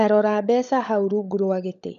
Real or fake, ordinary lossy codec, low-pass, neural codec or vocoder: fake; none; 7.2 kHz; codec, 16 kHz, 16 kbps, FunCodec, trained on LibriTTS, 50 frames a second